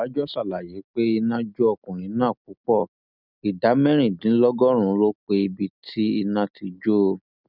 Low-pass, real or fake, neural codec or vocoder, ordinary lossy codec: 5.4 kHz; real; none; none